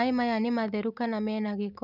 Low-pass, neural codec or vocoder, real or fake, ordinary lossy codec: 5.4 kHz; none; real; none